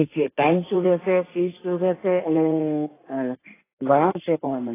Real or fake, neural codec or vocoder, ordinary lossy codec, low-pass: fake; codec, 16 kHz in and 24 kHz out, 2.2 kbps, FireRedTTS-2 codec; AAC, 16 kbps; 3.6 kHz